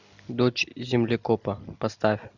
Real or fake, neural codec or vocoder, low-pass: real; none; 7.2 kHz